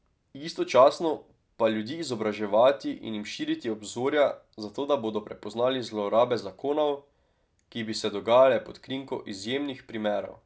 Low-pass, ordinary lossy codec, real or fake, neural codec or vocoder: none; none; real; none